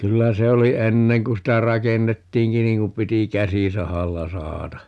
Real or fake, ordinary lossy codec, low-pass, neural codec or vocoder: real; none; none; none